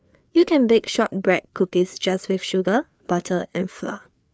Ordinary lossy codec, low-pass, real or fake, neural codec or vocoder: none; none; fake; codec, 16 kHz, 4 kbps, FreqCodec, larger model